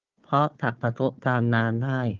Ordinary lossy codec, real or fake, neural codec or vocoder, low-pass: Opus, 32 kbps; fake; codec, 16 kHz, 4 kbps, FunCodec, trained on Chinese and English, 50 frames a second; 7.2 kHz